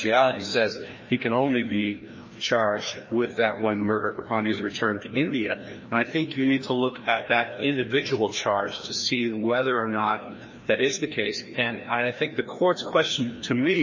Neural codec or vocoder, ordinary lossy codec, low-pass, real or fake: codec, 16 kHz, 1 kbps, FreqCodec, larger model; MP3, 32 kbps; 7.2 kHz; fake